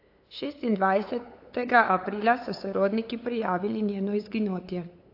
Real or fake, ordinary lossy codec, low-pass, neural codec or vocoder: fake; AAC, 32 kbps; 5.4 kHz; codec, 16 kHz, 8 kbps, FunCodec, trained on LibriTTS, 25 frames a second